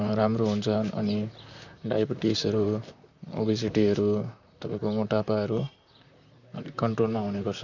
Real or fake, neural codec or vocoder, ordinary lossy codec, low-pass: fake; vocoder, 44.1 kHz, 128 mel bands, Pupu-Vocoder; none; 7.2 kHz